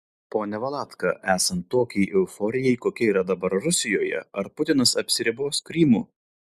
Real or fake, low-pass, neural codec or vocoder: real; 14.4 kHz; none